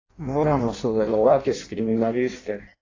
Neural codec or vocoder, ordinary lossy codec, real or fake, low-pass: codec, 16 kHz in and 24 kHz out, 0.6 kbps, FireRedTTS-2 codec; AAC, 32 kbps; fake; 7.2 kHz